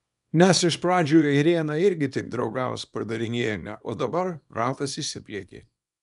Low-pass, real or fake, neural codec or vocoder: 10.8 kHz; fake; codec, 24 kHz, 0.9 kbps, WavTokenizer, small release